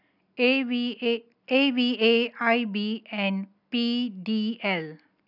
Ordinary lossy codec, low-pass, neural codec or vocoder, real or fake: none; 5.4 kHz; none; real